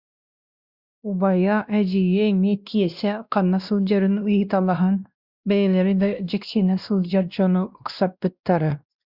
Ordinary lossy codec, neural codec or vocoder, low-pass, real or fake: Opus, 64 kbps; codec, 16 kHz, 1 kbps, X-Codec, WavLM features, trained on Multilingual LibriSpeech; 5.4 kHz; fake